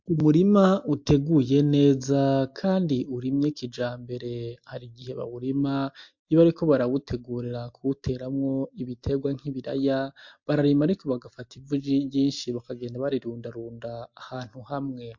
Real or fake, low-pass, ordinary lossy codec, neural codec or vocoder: real; 7.2 kHz; MP3, 48 kbps; none